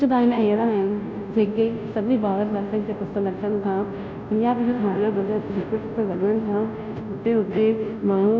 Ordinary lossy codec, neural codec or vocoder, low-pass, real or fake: none; codec, 16 kHz, 0.5 kbps, FunCodec, trained on Chinese and English, 25 frames a second; none; fake